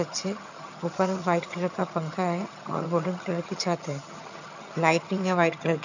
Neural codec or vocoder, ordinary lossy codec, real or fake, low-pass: vocoder, 22.05 kHz, 80 mel bands, HiFi-GAN; none; fake; 7.2 kHz